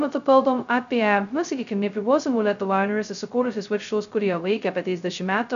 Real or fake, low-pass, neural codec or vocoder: fake; 7.2 kHz; codec, 16 kHz, 0.2 kbps, FocalCodec